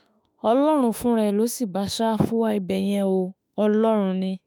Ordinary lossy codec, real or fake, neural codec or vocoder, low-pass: none; fake; autoencoder, 48 kHz, 32 numbers a frame, DAC-VAE, trained on Japanese speech; none